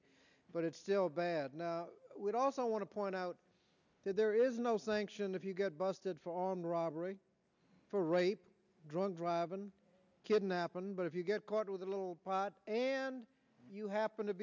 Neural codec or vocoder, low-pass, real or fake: none; 7.2 kHz; real